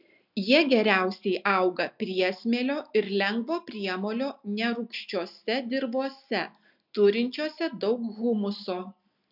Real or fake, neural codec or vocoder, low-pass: real; none; 5.4 kHz